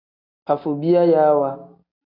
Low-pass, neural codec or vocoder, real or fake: 5.4 kHz; none; real